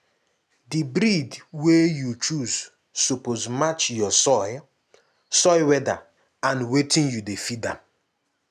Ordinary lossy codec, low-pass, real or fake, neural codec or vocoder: none; 14.4 kHz; real; none